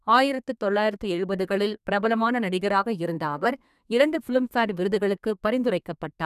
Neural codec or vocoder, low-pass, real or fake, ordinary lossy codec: codec, 32 kHz, 1.9 kbps, SNAC; 14.4 kHz; fake; none